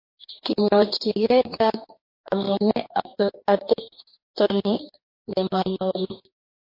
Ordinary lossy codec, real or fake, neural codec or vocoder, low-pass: MP3, 32 kbps; fake; codec, 44.1 kHz, 2.6 kbps, DAC; 5.4 kHz